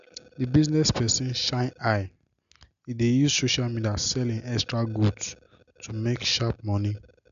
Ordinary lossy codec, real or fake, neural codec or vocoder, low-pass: MP3, 96 kbps; real; none; 7.2 kHz